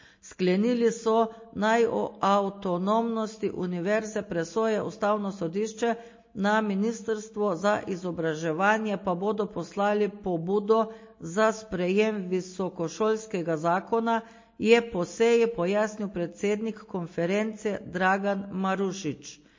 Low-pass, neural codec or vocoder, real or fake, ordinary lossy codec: 7.2 kHz; none; real; MP3, 32 kbps